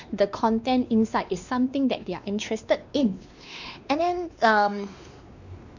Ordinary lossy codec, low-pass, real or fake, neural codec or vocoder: none; 7.2 kHz; fake; codec, 16 kHz, 2 kbps, X-Codec, WavLM features, trained on Multilingual LibriSpeech